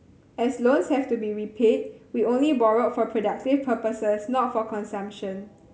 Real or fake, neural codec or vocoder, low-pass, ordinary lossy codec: real; none; none; none